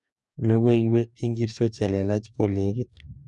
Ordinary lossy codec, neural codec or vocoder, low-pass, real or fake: none; codec, 44.1 kHz, 2.6 kbps, DAC; 10.8 kHz; fake